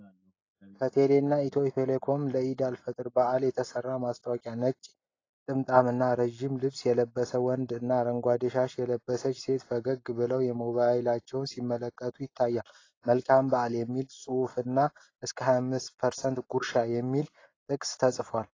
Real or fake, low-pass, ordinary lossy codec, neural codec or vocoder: real; 7.2 kHz; AAC, 32 kbps; none